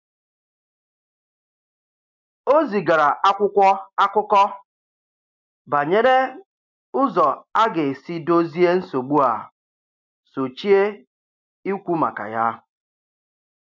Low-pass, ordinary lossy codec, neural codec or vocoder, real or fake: 7.2 kHz; MP3, 64 kbps; none; real